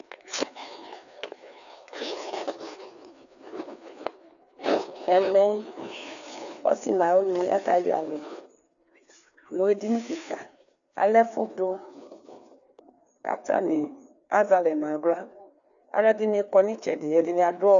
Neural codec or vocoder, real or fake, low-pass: codec, 16 kHz, 2 kbps, FreqCodec, larger model; fake; 7.2 kHz